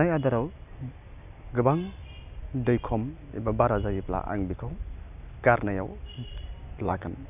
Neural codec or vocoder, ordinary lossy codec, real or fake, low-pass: none; none; real; 3.6 kHz